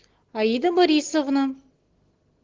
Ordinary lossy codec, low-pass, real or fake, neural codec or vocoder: Opus, 16 kbps; 7.2 kHz; real; none